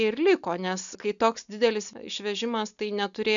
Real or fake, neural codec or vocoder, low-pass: real; none; 7.2 kHz